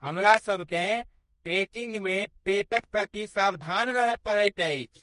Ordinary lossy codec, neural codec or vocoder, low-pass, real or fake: MP3, 48 kbps; codec, 24 kHz, 0.9 kbps, WavTokenizer, medium music audio release; 10.8 kHz; fake